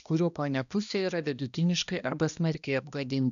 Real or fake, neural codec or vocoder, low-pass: fake; codec, 16 kHz, 1 kbps, X-Codec, HuBERT features, trained on balanced general audio; 7.2 kHz